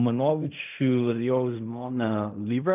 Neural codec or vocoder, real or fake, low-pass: codec, 16 kHz in and 24 kHz out, 0.4 kbps, LongCat-Audio-Codec, fine tuned four codebook decoder; fake; 3.6 kHz